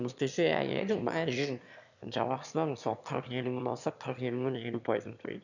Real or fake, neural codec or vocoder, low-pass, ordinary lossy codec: fake; autoencoder, 22.05 kHz, a latent of 192 numbers a frame, VITS, trained on one speaker; 7.2 kHz; none